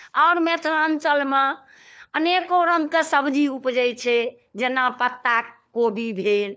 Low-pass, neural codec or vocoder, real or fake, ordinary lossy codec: none; codec, 16 kHz, 4 kbps, FunCodec, trained on LibriTTS, 50 frames a second; fake; none